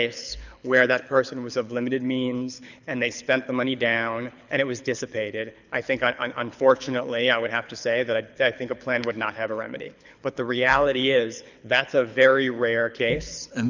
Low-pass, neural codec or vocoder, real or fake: 7.2 kHz; codec, 24 kHz, 6 kbps, HILCodec; fake